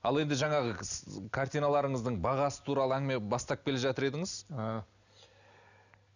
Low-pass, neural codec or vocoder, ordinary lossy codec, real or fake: 7.2 kHz; none; none; real